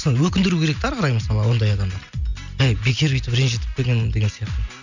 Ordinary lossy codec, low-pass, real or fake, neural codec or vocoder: none; 7.2 kHz; real; none